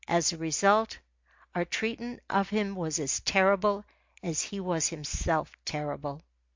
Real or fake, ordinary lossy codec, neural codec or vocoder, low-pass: real; MP3, 48 kbps; none; 7.2 kHz